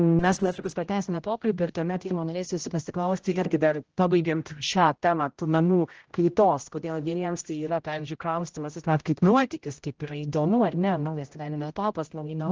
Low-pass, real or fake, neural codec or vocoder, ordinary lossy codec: 7.2 kHz; fake; codec, 16 kHz, 0.5 kbps, X-Codec, HuBERT features, trained on general audio; Opus, 16 kbps